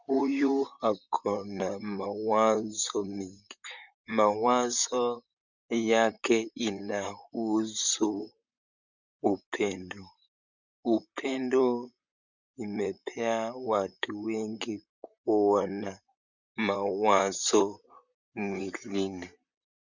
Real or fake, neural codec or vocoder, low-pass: fake; vocoder, 44.1 kHz, 128 mel bands, Pupu-Vocoder; 7.2 kHz